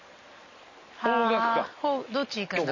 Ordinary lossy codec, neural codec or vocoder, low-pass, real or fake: MP3, 32 kbps; none; 7.2 kHz; real